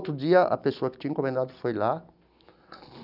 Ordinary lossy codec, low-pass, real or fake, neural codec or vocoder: none; 5.4 kHz; real; none